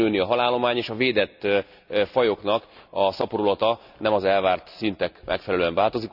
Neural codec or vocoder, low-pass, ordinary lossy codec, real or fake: none; 5.4 kHz; none; real